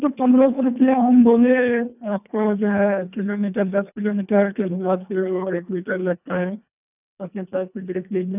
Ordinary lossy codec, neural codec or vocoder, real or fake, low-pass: none; codec, 24 kHz, 1.5 kbps, HILCodec; fake; 3.6 kHz